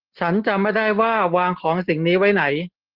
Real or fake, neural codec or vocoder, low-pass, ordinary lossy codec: real; none; 5.4 kHz; Opus, 16 kbps